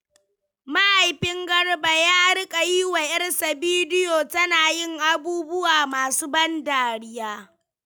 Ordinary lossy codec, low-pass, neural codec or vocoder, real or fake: none; none; none; real